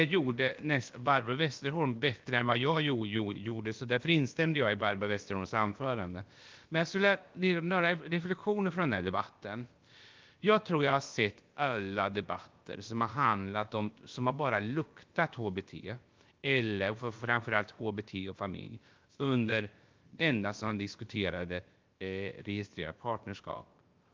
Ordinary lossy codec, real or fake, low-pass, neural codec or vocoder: Opus, 32 kbps; fake; 7.2 kHz; codec, 16 kHz, about 1 kbps, DyCAST, with the encoder's durations